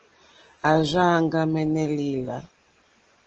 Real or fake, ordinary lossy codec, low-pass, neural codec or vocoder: real; Opus, 16 kbps; 7.2 kHz; none